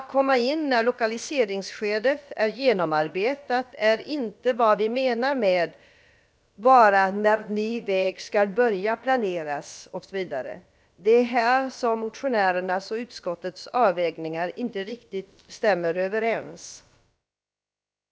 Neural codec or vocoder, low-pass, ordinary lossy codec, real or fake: codec, 16 kHz, about 1 kbps, DyCAST, with the encoder's durations; none; none; fake